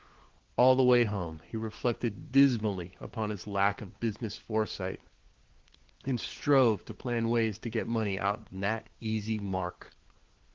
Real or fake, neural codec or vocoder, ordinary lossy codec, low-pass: fake; codec, 16 kHz, 4 kbps, FunCodec, trained on LibriTTS, 50 frames a second; Opus, 16 kbps; 7.2 kHz